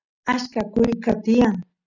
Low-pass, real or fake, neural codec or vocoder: 7.2 kHz; real; none